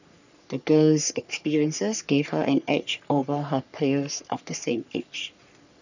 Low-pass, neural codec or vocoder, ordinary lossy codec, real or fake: 7.2 kHz; codec, 44.1 kHz, 3.4 kbps, Pupu-Codec; none; fake